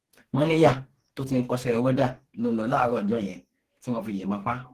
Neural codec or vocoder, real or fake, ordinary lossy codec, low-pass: codec, 44.1 kHz, 2.6 kbps, DAC; fake; Opus, 16 kbps; 14.4 kHz